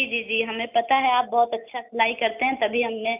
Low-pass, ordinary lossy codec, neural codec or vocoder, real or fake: 3.6 kHz; none; none; real